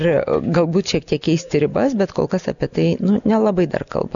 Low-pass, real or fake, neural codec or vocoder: 7.2 kHz; real; none